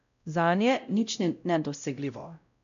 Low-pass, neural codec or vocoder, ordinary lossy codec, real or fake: 7.2 kHz; codec, 16 kHz, 0.5 kbps, X-Codec, WavLM features, trained on Multilingual LibriSpeech; none; fake